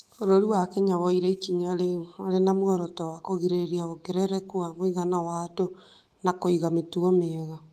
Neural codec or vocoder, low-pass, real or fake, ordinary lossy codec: autoencoder, 48 kHz, 128 numbers a frame, DAC-VAE, trained on Japanese speech; 14.4 kHz; fake; Opus, 24 kbps